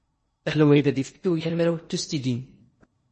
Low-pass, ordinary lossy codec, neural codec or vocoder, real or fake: 10.8 kHz; MP3, 32 kbps; codec, 16 kHz in and 24 kHz out, 0.8 kbps, FocalCodec, streaming, 65536 codes; fake